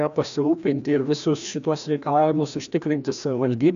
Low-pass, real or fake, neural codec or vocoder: 7.2 kHz; fake; codec, 16 kHz, 1 kbps, FreqCodec, larger model